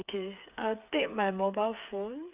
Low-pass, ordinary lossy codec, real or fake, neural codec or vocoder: 3.6 kHz; Opus, 64 kbps; fake; codec, 16 kHz, 8 kbps, FreqCodec, smaller model